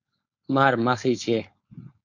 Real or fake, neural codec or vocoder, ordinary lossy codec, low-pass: fake; codec, 16 kHz, 4.8 kbps, FACodec; MP3, 48 kbps; 7.2 kHz